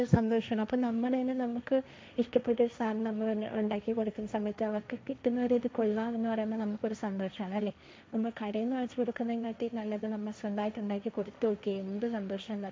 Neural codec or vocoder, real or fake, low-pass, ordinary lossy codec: codec, 16 kHz, 1.1 kbps, Voila-Tokenizer; fake; none; none